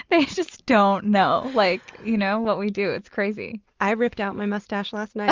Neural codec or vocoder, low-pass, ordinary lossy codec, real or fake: none; 7.2 kHz; Opus, 32 kbps; real